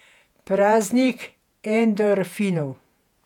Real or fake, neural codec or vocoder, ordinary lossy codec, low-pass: fake; vocoder, 48 kHz, 128 mel bands, Vocos; none; 19.8 kHz